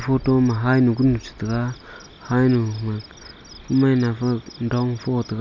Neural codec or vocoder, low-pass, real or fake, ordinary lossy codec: none; 7.2 kHz; real; none